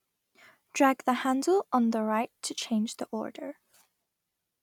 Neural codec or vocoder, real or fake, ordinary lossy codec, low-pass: none; real; none; 19.8 kHz